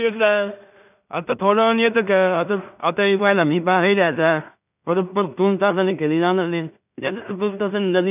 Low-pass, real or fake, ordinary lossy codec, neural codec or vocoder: 3.6 kHz; fake; none; codec, 16 kHz in and 24 kHz out, 0.4 kbps, LongCat-Audio-Codec, two codebook decoder